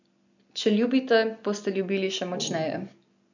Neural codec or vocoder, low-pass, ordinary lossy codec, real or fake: none; 7.2 kHz; none; real